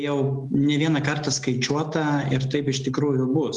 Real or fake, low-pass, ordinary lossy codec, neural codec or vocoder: real; 10.8 kHz; Opus, 24 kbps; none